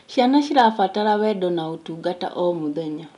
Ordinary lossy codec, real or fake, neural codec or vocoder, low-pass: none; real; none; 10.8 kHz